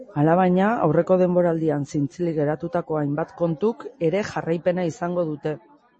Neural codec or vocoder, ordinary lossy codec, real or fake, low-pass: none; MP3, 32 kbps; real; 9.9 kHz